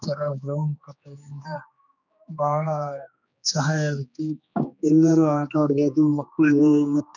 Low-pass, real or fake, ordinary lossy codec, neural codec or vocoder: 7.2 kHz; fake; none; codec, 16 kHz, 2 kbps, X-Codec, HuBERT features, trained on general audio